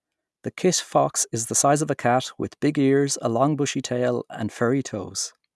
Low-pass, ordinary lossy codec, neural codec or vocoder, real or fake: none; none; none; real